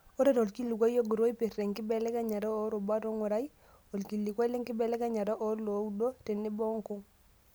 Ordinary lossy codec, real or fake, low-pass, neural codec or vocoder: none; real; none; none